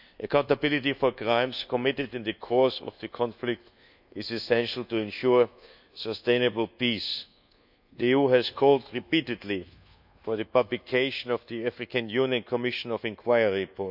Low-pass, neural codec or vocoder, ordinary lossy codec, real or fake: 5.4 kHz; codec, 24 kHz, 1.2 kbps, DualCodec; none; fake